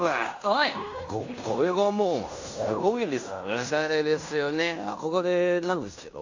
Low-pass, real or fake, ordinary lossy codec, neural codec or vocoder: 7.2 kHz; fake; AAC, 48 kbps; codec, 16 kHz in and 24 kHz out, 0.9 kbps, LongCat-Audio-Codec, fine tuned four codebook decoder